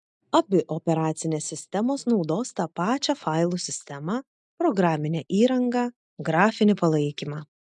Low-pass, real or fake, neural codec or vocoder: 10.8 kHz; real; none